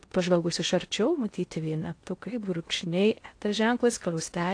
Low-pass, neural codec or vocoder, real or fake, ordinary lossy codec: 9.9 kHz; codec, 16 kHz in and 24 kHz out, 0.6 kbps, FocalCodec, streaming, 2048 codes; fake; AAC, 48 kbps